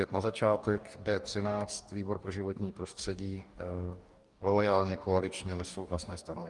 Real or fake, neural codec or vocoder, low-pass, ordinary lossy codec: fake; codec, 44.1 kHz, 2.6 kbps, DAC; 10.8 kHz; Opus, 32 kbps